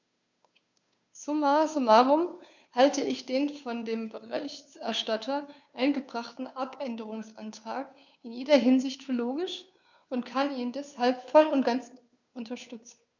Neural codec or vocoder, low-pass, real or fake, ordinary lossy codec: codec, 16 kHz, 2 kbps, FunCodec, trained on Chinese and English, 25 frames a second; 7.2 kHz; fake; none